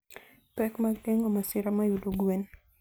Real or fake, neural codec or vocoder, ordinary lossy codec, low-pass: real; none; none; none